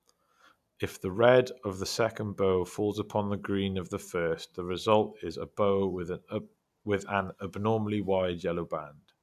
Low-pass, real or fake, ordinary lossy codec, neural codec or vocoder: 14.4 kHz; real; none; none